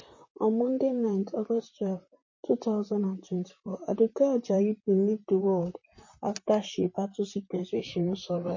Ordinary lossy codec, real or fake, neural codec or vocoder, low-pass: MP3, 32 kbps; fake; vocoder, 44.1 kHz, 128 mel bands, Pupu-Vocoder; 7.2 kHz